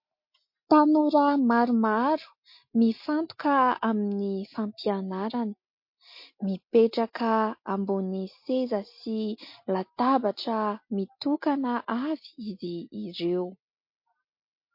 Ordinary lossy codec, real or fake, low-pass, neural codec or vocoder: MP3, 32 kbps; real; 5.4 kHz; none